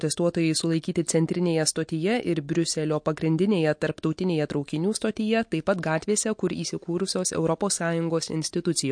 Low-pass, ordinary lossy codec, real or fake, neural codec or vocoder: 9.9 kHz; MP3, 48 kbps; real; none